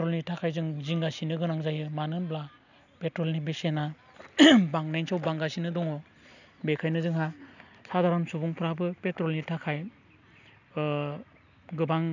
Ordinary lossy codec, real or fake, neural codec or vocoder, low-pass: none; real; none; 7.2 kHz